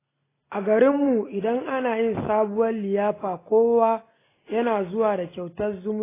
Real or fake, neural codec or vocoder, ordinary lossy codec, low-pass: real; none; AAC, 16 kbps; 3.6 kHz